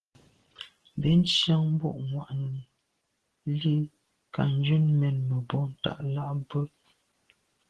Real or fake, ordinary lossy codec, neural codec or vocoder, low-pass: real; Opus, 16 kbps; none; 10.8 kHz